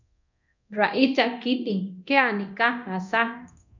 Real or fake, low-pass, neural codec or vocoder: fake; 7.2 kHz; codec, 24 kHz, 0.9 kbps, DualCodec